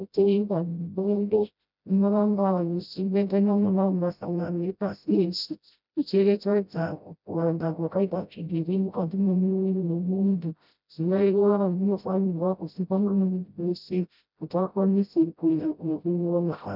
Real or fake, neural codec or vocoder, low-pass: fake; codec, 16 kHz, 0.5 kbps, FreqCodec, smaller model; 5.4 kHz